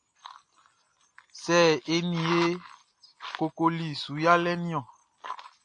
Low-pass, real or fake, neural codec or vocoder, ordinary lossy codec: 9.9 kHz; real; none; AAC, 48 kbps